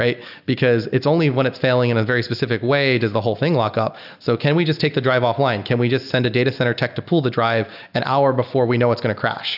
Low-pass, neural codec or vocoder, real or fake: 5.4 kHz; none; real